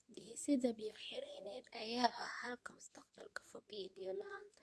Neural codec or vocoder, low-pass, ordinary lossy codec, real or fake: codec, 24 kHz, 0.9 kbps, WavTokenizer, medium speech release version 2; none; none; fake